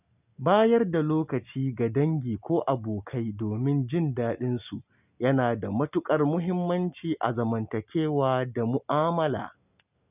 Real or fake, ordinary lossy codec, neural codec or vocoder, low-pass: real; none; none; 3.6 kHz